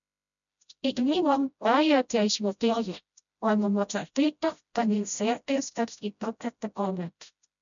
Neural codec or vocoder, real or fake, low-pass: codec, 16 kHz, 0.5 kbps, FreqCodec, smaller model; fake; 7.2 kHz